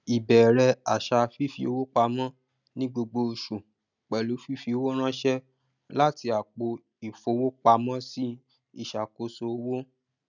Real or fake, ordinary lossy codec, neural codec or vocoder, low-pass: real; none; none; 7.2 kHz